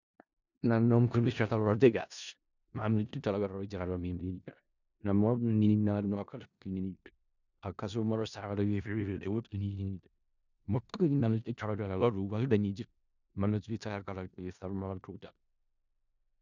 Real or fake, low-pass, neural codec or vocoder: fake; 7.2 kHz; codec, 16 kHz in and 24 kHz out, 0.4 kbps, LongCat-Audio-Codec, four codebook decoder